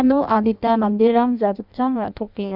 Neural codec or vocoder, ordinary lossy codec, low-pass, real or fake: codec, 16 kHz in and 24 kHz out, 0.6 kbps, FireRedTTS-2 codec; none; 5.4 kHz; fake